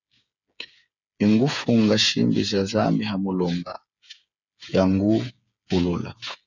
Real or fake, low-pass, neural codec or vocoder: fake; 7.2 kHz; codec, 16 kHz, 8 kbps, FreqCodec, smaller model